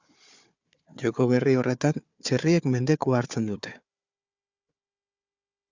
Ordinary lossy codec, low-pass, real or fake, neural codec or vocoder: Opus, 64 kbps; 7.2 kHz; fake; codec, 16 kHz, 4 kbps, FunCodec, trained on Chinese and English, 50 frames a second